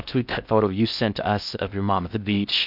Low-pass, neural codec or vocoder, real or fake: 5.4 kHz; codec, 16 kHz in and 24 kHz out, 0.6 kbps, FocalCodec, streaming, 4096 codes; fake